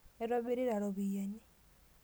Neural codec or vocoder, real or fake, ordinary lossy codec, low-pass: none; real; none; none